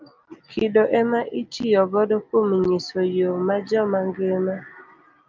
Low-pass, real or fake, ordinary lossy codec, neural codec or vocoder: 7.2 kHz; real; Opus, 24 kbps; none